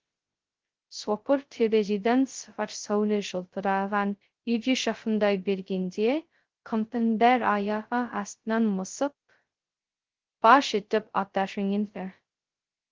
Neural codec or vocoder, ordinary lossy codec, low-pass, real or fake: codec, 16 kHz, 0.2 kbps, FocalCodec; Opus, 16 kbps; 7.2 kHz; fake